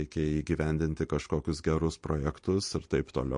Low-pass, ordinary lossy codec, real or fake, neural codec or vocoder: 9.9 kHz; MP3, 48 kbps; real; none